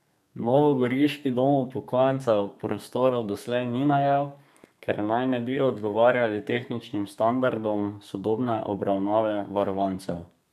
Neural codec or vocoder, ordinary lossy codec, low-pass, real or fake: codec, 32 kHz, 1.9 kbps, SNAC; none; 14.4 kHz; fake